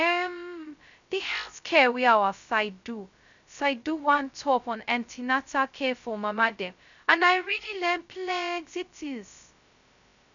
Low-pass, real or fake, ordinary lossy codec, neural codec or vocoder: 7.2 kHz; fake; none; codec, 16 kHz, 0.2 kbps, FocalCodec